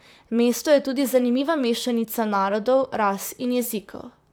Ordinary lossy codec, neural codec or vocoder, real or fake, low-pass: none; vocoder, 44.1 kHz, 128 mel bands, Pupu-Vocoder; fake; none